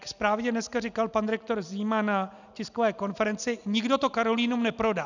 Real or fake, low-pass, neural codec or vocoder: real; 7.2 kHz; none